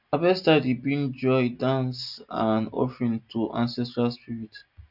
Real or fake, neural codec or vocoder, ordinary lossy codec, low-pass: real; none; none; 5.4 kHz